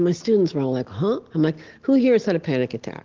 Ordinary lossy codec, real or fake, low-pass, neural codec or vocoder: Opus, 16 kbps; real; 7.2 kHz; none